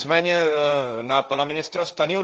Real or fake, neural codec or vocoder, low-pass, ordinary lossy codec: fake; codec, 16 kHz, 1.1 kbps, Voila-Tokenizer; 7.2 kHz; Opus, 24 kbps